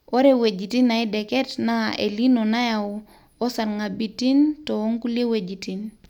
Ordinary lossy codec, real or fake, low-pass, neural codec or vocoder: none; real; 19.8 kHz; none